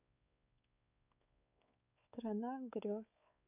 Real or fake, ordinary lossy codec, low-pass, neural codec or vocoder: fake; none; 3.6 kHz; codec, 16 kHz, 4 kbps, X-Codec, WavLM features, trained on Multilingual LibriSpeech